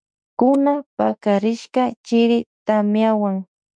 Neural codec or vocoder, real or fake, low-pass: autoencoder, 48 kHz, 32 numbers a frame, DAC-VAE, trained on Japanese speech; fake; 9.9 kHz